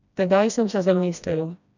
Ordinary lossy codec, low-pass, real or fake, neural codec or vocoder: none; 7.2 kHz; fake; codec, 16 kHz, 1 kbps, FreqCodec, smaller model